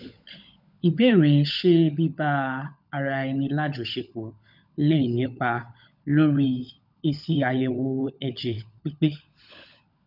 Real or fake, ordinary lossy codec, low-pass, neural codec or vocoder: fake; none; 5.4 kHz; codec, 16 kHz, 16 kbps, FunCodec, trained on LibriTTS, 50 frames a second